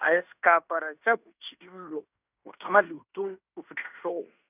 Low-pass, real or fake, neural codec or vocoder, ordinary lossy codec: 3.6 kHz; fake; codec, 16 kHz in and 24 kHz out, 0.9 kbps, LongCat-Audio-Codec, fine tuned four codebook decoder; none